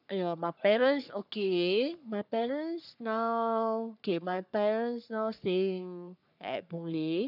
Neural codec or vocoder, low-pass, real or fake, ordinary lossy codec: codec, 44.1 kHz, 3.4 kbps, Pupu-Codec; 5.4 kHz; fake; none